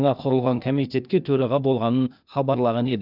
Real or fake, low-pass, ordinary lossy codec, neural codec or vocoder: fake; 5.4 kHz; none; codec, 16 kHz, 0.8 kbps, ZipCodec